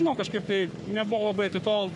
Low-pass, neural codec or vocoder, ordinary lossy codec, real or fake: 10.8 kHz; codec, 44.1 kHz, 3.4 kbps, Pupu-Codec; MP3, 96 kbps; fake